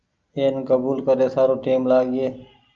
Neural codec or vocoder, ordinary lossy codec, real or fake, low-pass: none; Opus, 24 kbps; real; 7.2 kHz